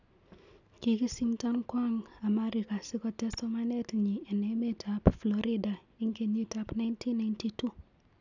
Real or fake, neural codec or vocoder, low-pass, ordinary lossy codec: real; none; 7.2 kHz; none